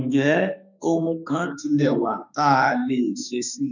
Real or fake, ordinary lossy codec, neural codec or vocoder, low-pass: fake; none; autoencoder, 48 kHz, 32 numbers a frame, DAC-VAE, trained on Japanese speech; 7.2 kHz